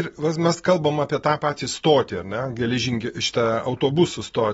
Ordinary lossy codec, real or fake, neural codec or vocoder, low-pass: AAC, 24 kbps; real; none; 19.8 kHz